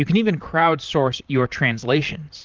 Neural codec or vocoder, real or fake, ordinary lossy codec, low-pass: vocoder, 44.1 kHz, 128 mel bands, Pupu-Vocoder; fake; Opus, 24 kbps; 7.2 kHz